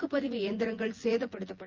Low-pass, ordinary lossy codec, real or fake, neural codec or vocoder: 7.2 kHz; Opus, 32 kbps; fake; vocoder, 24 kHz, 100 mel bands, Vocos